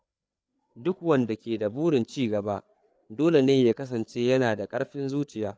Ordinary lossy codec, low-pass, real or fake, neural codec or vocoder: none; none; fake; codec, 16 kHz, 4 kbps, FreqCodec, larger model